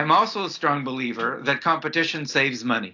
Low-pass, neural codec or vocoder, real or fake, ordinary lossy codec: 7.2 kHz; none; real; AAC, 48 kbps